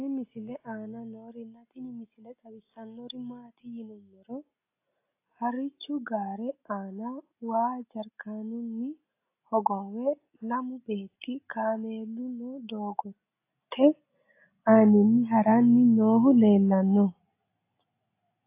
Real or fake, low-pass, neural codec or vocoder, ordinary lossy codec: real; 3.6 kHz; none; AAC, 24 kbps